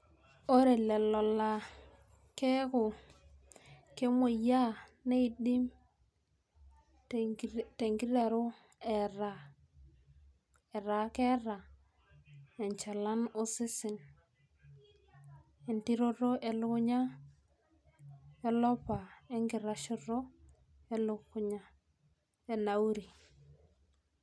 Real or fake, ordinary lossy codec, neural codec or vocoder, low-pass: real; none; none; none